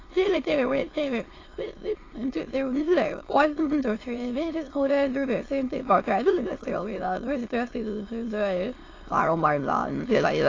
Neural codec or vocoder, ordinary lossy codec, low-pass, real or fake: autoencoder, 22.05 kHz, a latent of 192 numbers a frame, VITS, trained on many speakers; AAC, 32 kbps; 7.2 kHz; fake